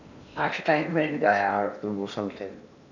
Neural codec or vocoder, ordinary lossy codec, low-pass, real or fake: codec, 16 kHz in and 24 kHz out, 0.8 kbps, FocalCodec, streaming, 65536 codes; none; 7.2 kHz; fake